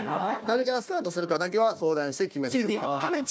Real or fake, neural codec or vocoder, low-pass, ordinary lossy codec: fake; codec, 16 kHz, 1 kbps, FunCodec, trained on Chinese and English, 50 frames a second; none; none